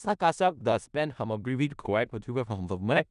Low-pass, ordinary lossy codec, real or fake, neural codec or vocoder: 10.8 kHz; none; fake; codec, 16 kHz in and 24 kHz out, 0.4 kbps, LongCat-Audio-Codec, four codebook decoder